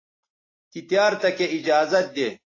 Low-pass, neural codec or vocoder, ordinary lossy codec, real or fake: 7.2 kHz; none; AAC, 32 kbps; real